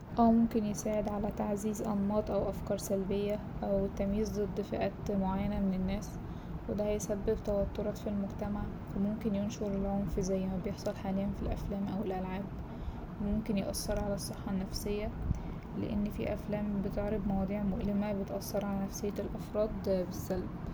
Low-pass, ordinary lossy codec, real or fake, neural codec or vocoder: none; none; real; none